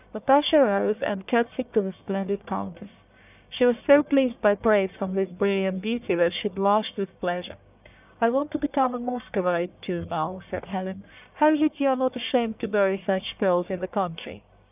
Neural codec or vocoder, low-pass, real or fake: codec, 44.1 kHz, 1.7 kbps, Pupu-Codec; 3.6 kHz; fake